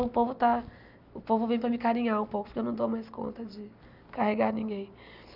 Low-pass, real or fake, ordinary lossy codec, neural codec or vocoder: 5.4 kHz; real; none; none